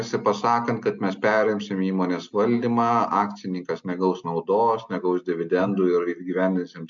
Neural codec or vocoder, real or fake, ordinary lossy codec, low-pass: none; real; MP3, 64 kbps; 7.2 kHz